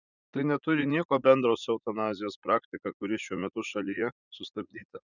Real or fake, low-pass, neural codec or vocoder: fake; 7.2 kHz; vocoder, 44.1 kHz, 80 mel bands, Vocos